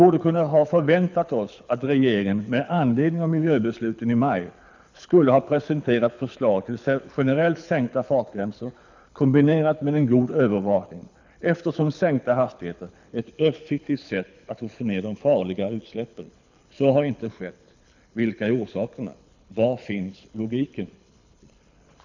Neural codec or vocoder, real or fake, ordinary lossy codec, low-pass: codec, 24 kHz, 6 kbps, HILCodec; fake; none; 7.2 kHz